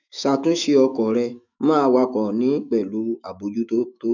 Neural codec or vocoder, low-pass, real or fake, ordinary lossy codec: autoencoder, 48 kHz, 128 numbers a frame, DAC-VAE, trained on Japanese speech; 7.2 kHz; fake; none